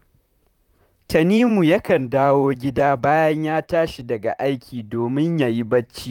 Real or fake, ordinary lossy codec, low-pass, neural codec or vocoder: fake; none; 19.8 kHz; vocoder, 44.1 kHz, 128 mel bands, Pupu-Vocoder